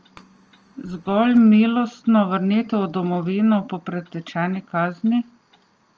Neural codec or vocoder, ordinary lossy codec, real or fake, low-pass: none; Opus, 24 kbps; real; 7.2 kHz